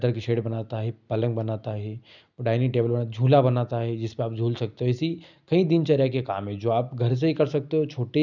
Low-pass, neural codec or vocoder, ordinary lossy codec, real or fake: 7.2 kHz; none; none; real